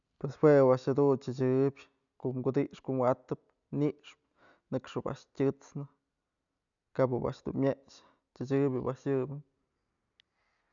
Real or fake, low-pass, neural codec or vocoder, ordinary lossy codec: real; 7.2 kHz; none; none